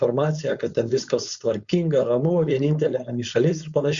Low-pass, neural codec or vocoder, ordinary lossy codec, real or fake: 7.2 kHz; codec, 16 kHz, 4.8 kbps, FACodec; Opus, 64 kbps; fake